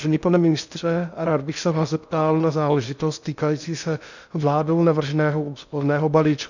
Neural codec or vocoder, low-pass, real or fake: codec, 16 kHz in and 24 kHz out, 0.6 kbps, FocalCodec, streaming, 2048 codes; 7.2 kHz; fake